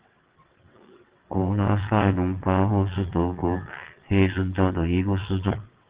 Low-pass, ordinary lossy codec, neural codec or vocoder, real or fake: 3.6 kHz; Opus, 16 kbps; vocoder, 22.05 kHz, 80 mel bands, WaveNeXt; fake